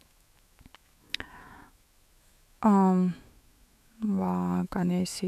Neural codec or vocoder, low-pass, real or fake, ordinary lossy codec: autoencoder, 48 kHz, 128 numbers a frame, DAC-VAE, trained on Japanese speech; 14.4 kHz; fake; none